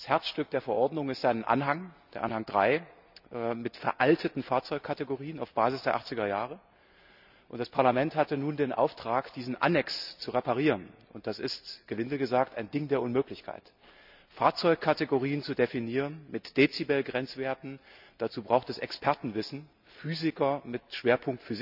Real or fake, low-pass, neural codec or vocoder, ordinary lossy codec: real; 5.4 kHz; none; none